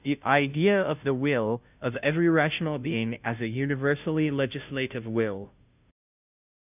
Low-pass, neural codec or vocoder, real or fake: 3.6 kHz; codec, 16 kHz, 0.5 kbps, FunCodec, trained on Chinese and English, 25 frames a second; fake